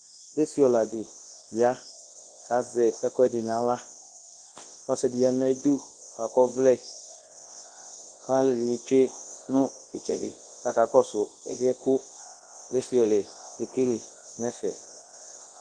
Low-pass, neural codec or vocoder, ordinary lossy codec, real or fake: 9.9 kHz; codec, 24 kHz, 0.9 kbps, WavTokenizer, large speech release; Opus, 16 kbps; fake